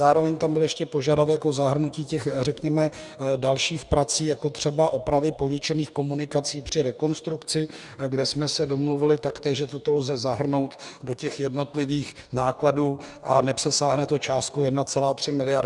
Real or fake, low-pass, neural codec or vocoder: fake; 10.8 kHz; codec, 44.1 kHz, 2.6 kbps, DAC